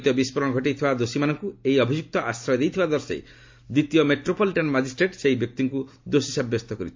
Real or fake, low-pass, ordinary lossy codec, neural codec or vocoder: real; 7.2 kHz; MP3, 48 kbps; none